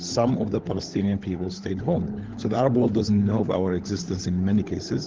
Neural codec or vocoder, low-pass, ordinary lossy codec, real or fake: codec, 16 kHz, 16 kbps, FunCodec, trained on LibriTTS, 50 frames a second; 7.2 kHz; Opus, 16 kbps; fake